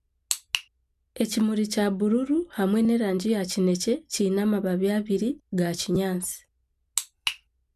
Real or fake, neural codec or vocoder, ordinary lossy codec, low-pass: real; none; none; 14.4 kHz